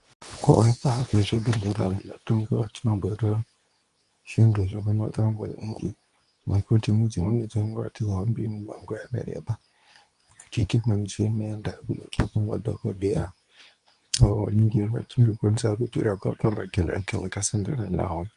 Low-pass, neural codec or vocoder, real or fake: 10.8 kHz; codec, 24 kHz, 0.9 kbps, WavTokenizer, medium speech release version 2; fake